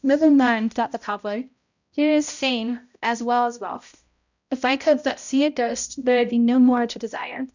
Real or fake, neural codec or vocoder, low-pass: fake; codec, 16 kHz, 0.5 kbps, X-Codec, HuBERT features, trained on balanced general audio; 7.2 kHz